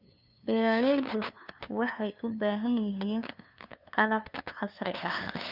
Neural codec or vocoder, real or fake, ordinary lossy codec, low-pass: codec, 16 kHz, 2 kbps, FunCodec, trained on LibriTTS, 25 frames a second; fake; none; 5.4 kHz